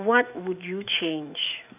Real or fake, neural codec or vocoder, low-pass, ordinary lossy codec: fake; autoencoder, 48 kHz, 128 numbers a frame, DAC-VAE, trained on Japanese speech; 3.6 kHz; none